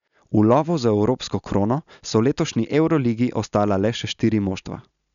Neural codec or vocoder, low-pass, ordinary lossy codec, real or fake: none; 7.2 kHz; none; real